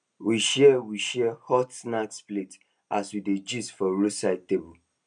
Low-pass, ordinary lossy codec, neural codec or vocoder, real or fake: 9.9 kHz; none; none; real